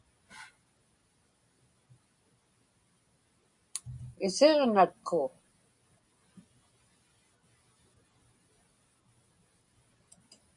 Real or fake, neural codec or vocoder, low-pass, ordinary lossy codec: real; none; 10.8 kHz; MP3, 96 kbps